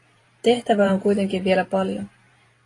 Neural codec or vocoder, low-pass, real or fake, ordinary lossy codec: vocoder, 44.1 kHz, 128 mel bands every 512 samples, BigVGAN v2; 10.8 kHz; fake; AAC, 32 kbps